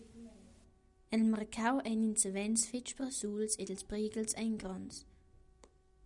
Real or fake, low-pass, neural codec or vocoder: real; 10.8 kHz; none